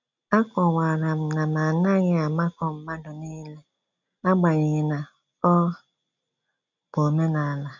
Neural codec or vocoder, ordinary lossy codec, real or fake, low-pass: none; none; real; 7.2 kHz